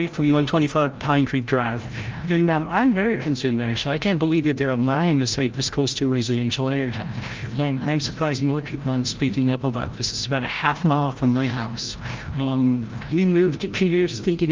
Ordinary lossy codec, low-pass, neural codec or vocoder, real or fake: Opus, 24 kbps; 7.2 kHz; codec, 16 kHz, 0.5 kbps, FreqCodec, larger model; fake